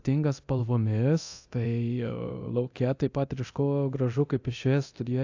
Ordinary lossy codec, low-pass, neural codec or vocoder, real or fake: AAC, 48 kbps; 7.2 kHz; codec, 24 kHz, 0.9 kbps, DualCodec; fake